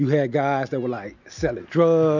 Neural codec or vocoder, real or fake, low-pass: none; real; 7.2 kHz